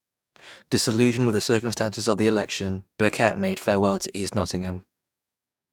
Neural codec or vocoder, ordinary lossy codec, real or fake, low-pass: codec, 44.1 kHz, 2.6 kbps, DAC; none; fake; 19.8 kHz